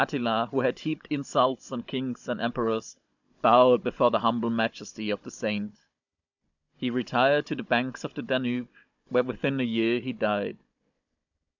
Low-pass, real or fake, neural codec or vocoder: 7.2 kHz; fake; codec, 16 kHz, 16 kbps, FunCodec, trained on Chinese and English, 50 frames a second